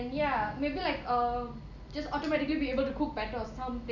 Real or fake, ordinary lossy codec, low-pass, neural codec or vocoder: real; none; 7.2 kHz; none